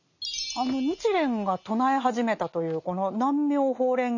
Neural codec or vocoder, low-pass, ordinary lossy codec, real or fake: none; 7.2 kHz; none; real